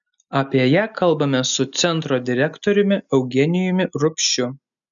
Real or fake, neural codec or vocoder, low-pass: real; none; 7.2 kHz